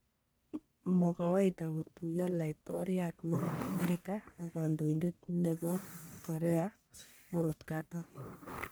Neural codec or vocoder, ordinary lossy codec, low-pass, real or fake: codec, 44.1 kHz, 1.7 kbps, Pupu-Codec; none; none; fake